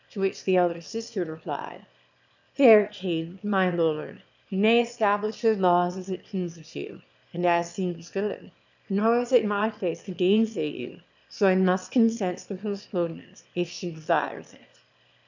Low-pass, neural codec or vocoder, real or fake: 7.2 kHz; autoencoder, 22.05 kHz, a latent of 192 numbers a frame, VITS, trained on one speaker; fake